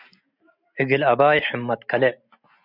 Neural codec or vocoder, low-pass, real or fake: none; 5.4 kHz; real